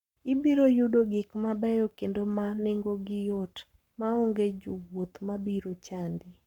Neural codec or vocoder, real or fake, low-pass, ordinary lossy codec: codec, 44.1 kHz, 7.8 kbps, Pupu-Codec; fake; 19.8 kHz; none